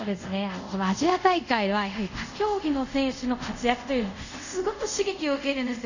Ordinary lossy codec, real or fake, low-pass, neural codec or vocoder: none; fake; 7.2 kHz; codec, 24 kHz, 0.5 kbps, DualCodec